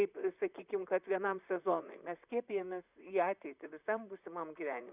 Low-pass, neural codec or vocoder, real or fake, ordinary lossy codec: 3.6 kHz; vocoder, 44.1 kHz, 128 mel bands, Pupu-Vocoder; fake; Opus, 64 kbps